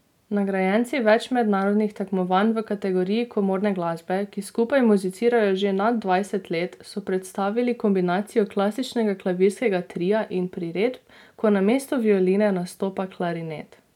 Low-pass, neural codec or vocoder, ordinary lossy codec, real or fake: 19.8 kHz; none; none; real